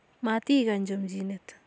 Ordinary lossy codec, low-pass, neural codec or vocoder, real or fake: none; none; none; real